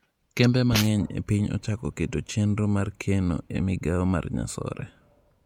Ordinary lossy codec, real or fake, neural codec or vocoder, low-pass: MP3, 96 kbps; fake; vocoder, 48 kHz, 128 mel bands, Vocos; 19.8 kHz